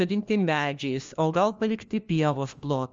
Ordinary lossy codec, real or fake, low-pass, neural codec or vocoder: Opus, 32 kbps; fake; 7.2 kHz; codec, 16 kHz, 1 kbps, FunCodec, trained on LibriTTS, 50 frames a second